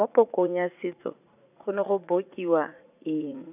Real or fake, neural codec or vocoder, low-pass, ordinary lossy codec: fake; vocoder, 44.1 kHz, 80 mel bands, Vocos; 3.6 kHz; none